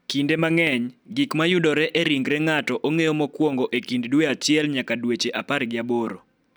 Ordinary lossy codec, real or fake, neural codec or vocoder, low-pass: none; real; none; none